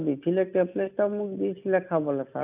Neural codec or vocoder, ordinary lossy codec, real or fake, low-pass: none; none; real; 3.6 kHz